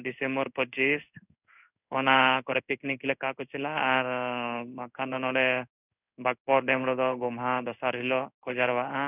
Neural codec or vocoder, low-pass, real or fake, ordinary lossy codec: codec, 16 kHz in and 24 kHz out, 1 kbps, XY-Tokenizer; 3.6 kHz; fake; none